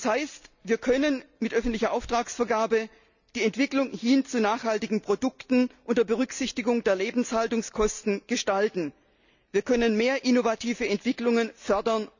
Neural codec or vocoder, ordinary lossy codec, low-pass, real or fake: none; none; 7.2 kHz; real